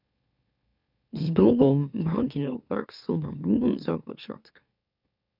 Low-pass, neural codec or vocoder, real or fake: 5.4 kHz; autoencoder, 44.1 kHz, a latent of 192 numbers a frame, MeloTTS; fake